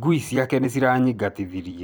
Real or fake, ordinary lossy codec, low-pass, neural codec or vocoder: fake; none; none; vocoder, 44.1 kHz, 128 mel bands every 256 samples, BigVGAN v2